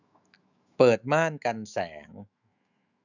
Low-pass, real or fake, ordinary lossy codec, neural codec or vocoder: 7.2 kHz; fake; none; autoencoder, 48 kHz, 128 numbers a frame, DAC-VAE, trained on Japanese speech